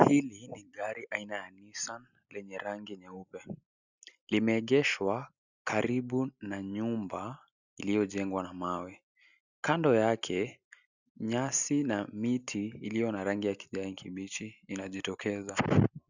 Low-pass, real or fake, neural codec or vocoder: 7.2 kHz; real; none